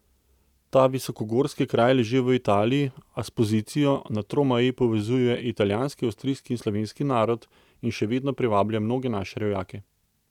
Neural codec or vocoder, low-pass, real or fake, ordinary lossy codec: none; 19.8 kHz; real; none